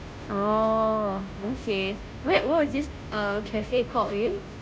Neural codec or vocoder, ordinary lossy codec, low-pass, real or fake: codec, 16 kHz, 0.5 kbps, FunCodec, trained on Chinese and English, 25 frames a second; none; none; fake